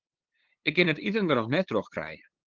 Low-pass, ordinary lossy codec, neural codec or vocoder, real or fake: 7.2 kHz; Opus, 16 kbps; codec, 16 kHz, 8 kbps, FunCodec, trained on LibriTTS, 25 frames a second; fake